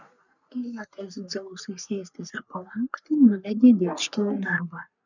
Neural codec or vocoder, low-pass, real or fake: codec, 44.1 kHz, 3.4 kbps, Pupu-Codec; 7.2 kHz; fake